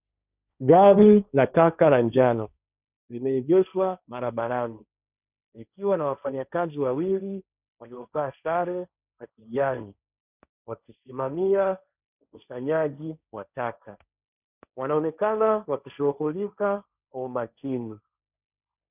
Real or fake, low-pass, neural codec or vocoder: fake; 3.6 kHz; codec, 16 kHz, 1.1 kbps, Voila-Tokenizer